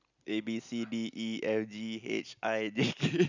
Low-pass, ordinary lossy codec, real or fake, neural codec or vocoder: 7.2 kHz; none; real; none